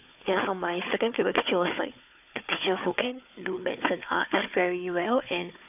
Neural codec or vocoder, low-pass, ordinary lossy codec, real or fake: codec, 16 kHz, 4 kbps, FunCodec, trained on Chinese and English, 50 frames a second; 3.6 kHz; none; fake